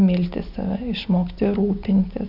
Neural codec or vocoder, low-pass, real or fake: none; 5.4 kHz; real